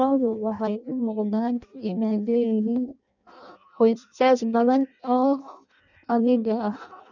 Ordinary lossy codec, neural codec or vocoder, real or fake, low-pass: none; codec, 16 kHz in and 24 kHz out, 0.6 kbps, FireRedTTS-2 codec; fake; 7.2 kHz